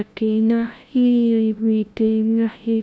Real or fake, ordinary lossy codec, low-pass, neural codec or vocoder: fake; none; none; codec, 16 kHz, 0.5 kbps, FunCodec, trained on LibriTTS, 25 frames a second